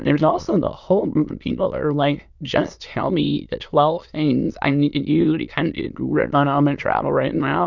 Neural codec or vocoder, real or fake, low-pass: autoencoder, 22.05 kHz, a latent of 192 numbers a frame, VITS, trained on many speakers; fake; 7.2 kHz